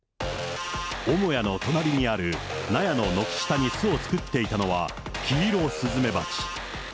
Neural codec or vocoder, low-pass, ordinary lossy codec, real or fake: none; none; none; real